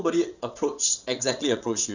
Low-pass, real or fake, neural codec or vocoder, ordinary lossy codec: 7.2 kHz; fake; vocoder, 22.05 kHz, 80 mel bands, WaveNeXt; none